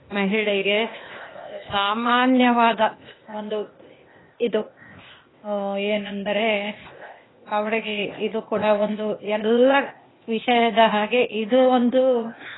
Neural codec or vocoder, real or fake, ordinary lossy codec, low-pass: codec, 16 kHz, 0.8 kbps, ZipCodec; fake; AAC, 16 kbps; 7.2 kHz